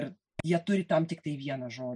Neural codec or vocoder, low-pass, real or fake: none; 10.8 kHz; real